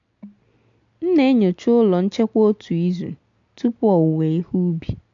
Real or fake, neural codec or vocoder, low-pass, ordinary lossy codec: real; none; 7.2 kHz; AAC, 64 kbps